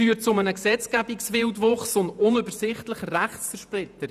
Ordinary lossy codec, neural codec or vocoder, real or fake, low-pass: none; vocoder, 48 kHz, 128 mel bands, Vocos; fake; 14.4 kHz